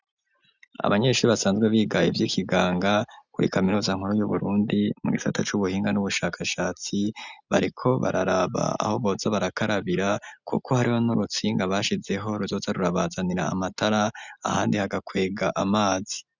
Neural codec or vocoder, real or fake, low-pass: none; real; 7.2 kHz